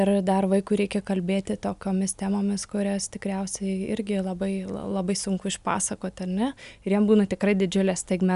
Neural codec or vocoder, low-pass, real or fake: none; 10.8 kHz; real